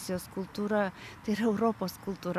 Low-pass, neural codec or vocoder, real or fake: 14.4 kHz; none; real